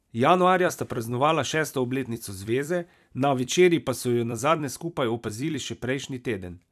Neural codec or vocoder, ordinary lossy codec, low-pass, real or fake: vocoder, 44.1 kHz, 128 mel bands every 256 samples, BigVGAN v2; none; 14.4 kHz; fake